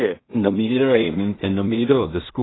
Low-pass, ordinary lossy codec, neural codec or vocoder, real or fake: 7.2 kHz; AAC, 16 kbps; codec, 16 kHz in and 24 kHz out, 0.4 kbps, LongCat-Audio-Codec, two codebook decoder; fake